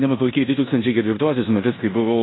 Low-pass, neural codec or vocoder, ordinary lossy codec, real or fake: 7.2 kHz; codec, 16 kHz in and 24 kHz out, 0.9 kbps, LongCat-Audio-Codec, four codebook decoder; AAC, 16 kbps; fake